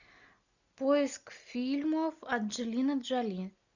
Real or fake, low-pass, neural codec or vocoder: real; 7.2 kHz; none